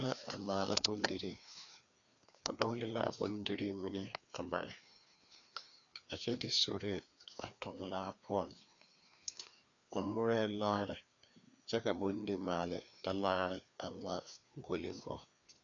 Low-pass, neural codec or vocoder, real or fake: 7.2 kHz; codec, 16 kHz, 2 kbps, FreqCodec, larger model; fake